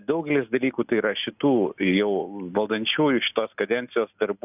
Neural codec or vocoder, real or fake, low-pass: none; real; 3.6 kHz